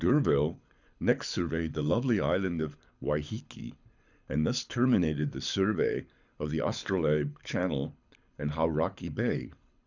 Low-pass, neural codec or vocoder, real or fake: 7.2 kHz; codec, 24 kHz, 6 kbps, HILCodec; fake